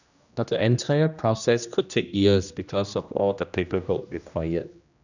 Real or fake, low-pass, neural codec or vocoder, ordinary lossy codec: fake; 7.2 kHz; codec, 16 kHz, 1 kbps, X-Codec, HuBERT features, trained on general audio; none